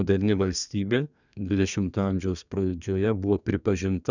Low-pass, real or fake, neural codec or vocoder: 7.2 kHz; fake; codec, 32 kHz, 1.9 kbps, SNAC